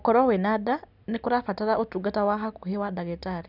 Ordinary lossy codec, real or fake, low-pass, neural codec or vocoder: none; real; 5.4 kHz; none